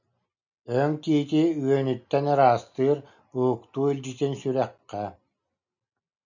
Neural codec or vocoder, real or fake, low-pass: none; real; 7.2 kHz